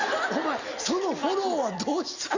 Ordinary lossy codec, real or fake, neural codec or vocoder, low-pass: Opus, 64 kbps; real; none; 7.2 kHz